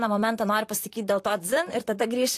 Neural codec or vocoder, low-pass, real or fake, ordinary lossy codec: vocoder, 44.1 kHz, 128 mel bands, Pupu-Vocoder; 14.4 kHz; fake; AAC, 64 kbps